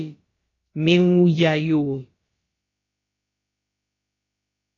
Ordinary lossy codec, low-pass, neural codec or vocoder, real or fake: AAC, 32 kbps; 7.2 kHz; codec, 16 kHz, about 1 kbps, DyCAST, with the encoder's durations; fake